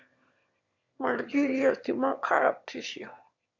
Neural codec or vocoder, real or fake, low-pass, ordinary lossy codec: autoencoder, 22.05 kHz, a latent of 192 numbers a frame, VITS, trained on one speaker; fake; 7.2 kHz; Opus, 64 kbps